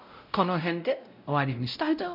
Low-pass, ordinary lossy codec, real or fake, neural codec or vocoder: 5.4 kHz; none; fake; codec, 16 kHz, 0.5 kbps, X-Codec, WavLM features, trained on Multilingual LibriSpeech